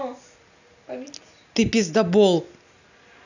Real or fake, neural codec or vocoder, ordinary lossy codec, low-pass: real; none; none; 7.2 kHz